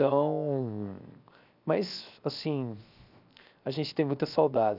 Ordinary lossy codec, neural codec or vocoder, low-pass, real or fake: none; codec, 16 kHz, 0.7 kbps, FocalCodec; 5.4 kHz; fake